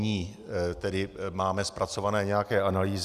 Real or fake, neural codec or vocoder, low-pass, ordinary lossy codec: real; none; 14.4 kHz; Opus, 64 kbps